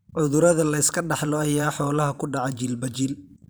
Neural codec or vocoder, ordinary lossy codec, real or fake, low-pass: vocoder, 44.1 kHz, 128 mel bands every 512 samples, BigVGAN v2; none; fake; none